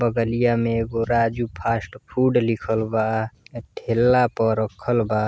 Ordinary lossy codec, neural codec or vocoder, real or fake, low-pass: none; none; real; none